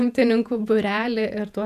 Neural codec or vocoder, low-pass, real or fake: vocoder, 48 kHz, 128 mel bands, Vocos; 14.4 kHz; fake